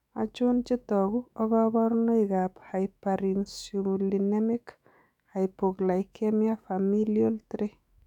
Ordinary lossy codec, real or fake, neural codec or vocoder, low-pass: none; fake; autoencoder, 48 kHz, 128 numbers a frame, DAC-VAE, trained on Japanese speech; 19.8 kHz